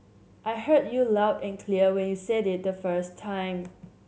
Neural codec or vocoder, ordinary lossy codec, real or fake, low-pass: none; none; real; none